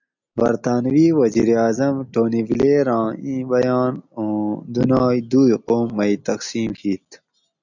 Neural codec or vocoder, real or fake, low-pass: none; real; 7.2 kHz